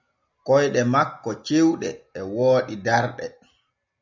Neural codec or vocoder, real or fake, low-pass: none; real; 7.2 kHz